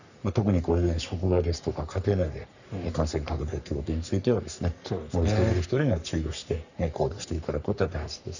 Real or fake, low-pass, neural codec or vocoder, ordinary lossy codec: fake; 7.2 kHz; codec, 44.1 kHz, 3.4 kbps, Pupu-Codec; none